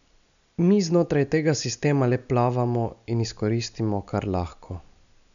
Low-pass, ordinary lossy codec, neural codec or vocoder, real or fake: 7.2 kHz; none; none; real